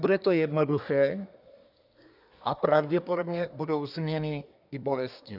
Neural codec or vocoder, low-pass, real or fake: codec, 24 kHz, 1 kbps, SNAC; 5.4 kHz; fake